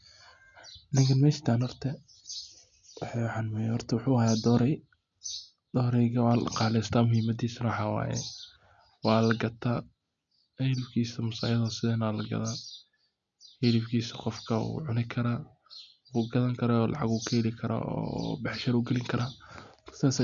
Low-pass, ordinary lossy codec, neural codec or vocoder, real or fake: 7.2 kHz; none; none; real